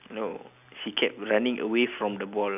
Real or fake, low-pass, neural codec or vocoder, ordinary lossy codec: real; 3.6 kHz; none; none